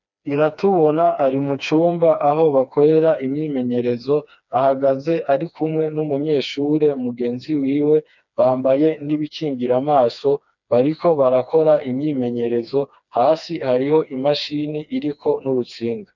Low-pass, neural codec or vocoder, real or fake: 7.2 kHz; codec, 16 kHz, 2 kbps, FreqCodec, smaller model; fake